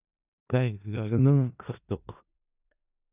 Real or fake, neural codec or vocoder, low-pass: fake; codec, 16 kHz in and 24 kHz out, 0.4 kbps, LongCat-Audio-Codec, four codebook decoder; 3.6 kHz